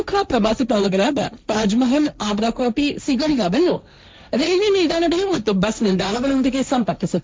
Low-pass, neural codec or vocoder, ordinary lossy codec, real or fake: none; codec, 16 kHz, 1.1 kbps, Voila-Tokenizer; none; fake